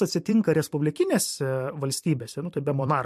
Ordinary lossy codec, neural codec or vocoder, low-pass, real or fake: MP3, 64 kbps; vocoder, 44.1 kHz, 128 mel bands every 256 samples, BigVGAN v2; 14.4 kHz; fake